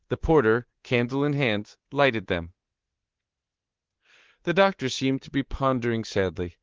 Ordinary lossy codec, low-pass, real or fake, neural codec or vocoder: Opus, 16 kbps; 7.2 kHz; fake; codec, 24 kHz, 3.1 kbps, DualCodec